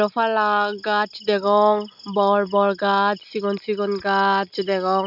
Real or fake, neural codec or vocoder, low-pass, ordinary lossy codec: real; none; 5.4 kHz; none